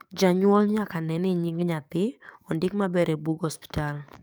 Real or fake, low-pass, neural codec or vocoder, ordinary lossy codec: fake; none; codec, 44.1 kHz, 7.8 kbps, DAC; none